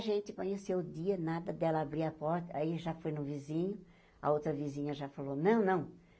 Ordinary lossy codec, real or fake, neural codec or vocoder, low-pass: none; real; none; none